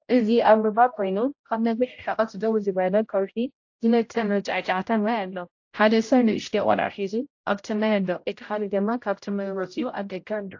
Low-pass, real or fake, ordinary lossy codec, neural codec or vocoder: 7.2 kHz; fake; AAC, 48 kbps; codec, 16 kHz, 0.5 kbps, X-Codec, HuBERT features, trained on general audio